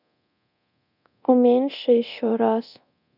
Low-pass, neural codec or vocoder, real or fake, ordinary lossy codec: 5.4 kHz; codec, 24 kHz, 0.9 kbps, DualCodec; fake; none